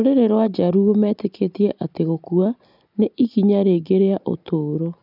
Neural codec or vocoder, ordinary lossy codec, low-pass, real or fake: none; none; 5.4 kHz; real